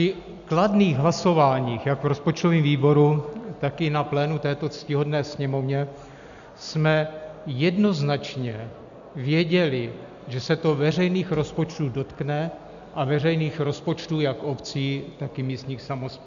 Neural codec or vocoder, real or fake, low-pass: none; real; 7.2 kHz